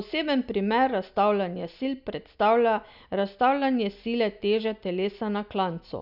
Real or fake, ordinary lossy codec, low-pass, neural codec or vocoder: real; none; 5.4 kHz; none